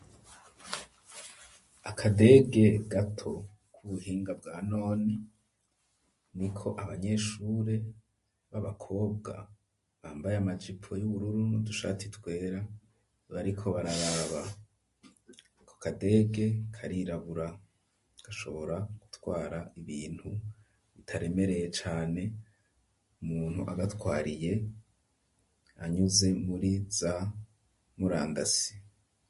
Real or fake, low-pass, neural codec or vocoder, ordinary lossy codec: real; 14.4 kHz; none; MP3, 48 kbps